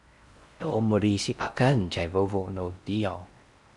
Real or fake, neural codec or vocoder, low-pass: fake; codec, 16 kHz in and 24 kHz out, 0.6 kbps, FocalCodec, streaming, 4096 codes; 10.8 kHz